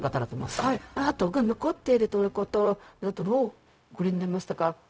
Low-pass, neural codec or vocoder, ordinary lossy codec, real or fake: none; codec, 16 kHz, 0.4 kbps, LongCat-Audio-Codec; none; fake